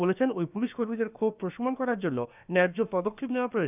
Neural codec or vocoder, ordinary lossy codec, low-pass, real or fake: codec, 16 kHz, about 1 kbps, DyCAST, with the encoder's durations; none; 3.6 kHz; fake